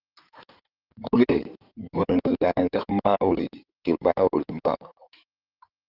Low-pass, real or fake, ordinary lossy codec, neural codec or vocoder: 5.4 kHz; fake; Opus, 24 kbps; codec, 16 kHz in and 24 kHz out, 2.2 kbps, FireRedTTS-2 codec